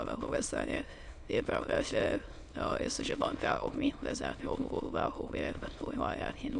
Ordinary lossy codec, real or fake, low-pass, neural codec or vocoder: AAC, 64 kbps; fake; 9.9 kHz; autoencoder, 22.05 kHz, a latent of 192 numbers a frame, VITS, trained on many speakers